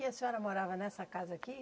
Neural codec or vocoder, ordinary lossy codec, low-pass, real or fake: none; none; none; real